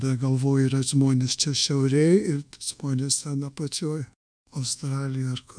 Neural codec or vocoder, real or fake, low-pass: codec, 24 kHz, 1.2 kbps, DualCodec; fake; 9.9 kHz